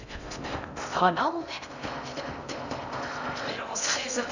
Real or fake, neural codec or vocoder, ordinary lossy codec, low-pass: fake; codec, 16 kHz in and 24 kHz out, 0.6 kbps, FocalCodec, streaming, 4096 codes; none; 7.2 kHz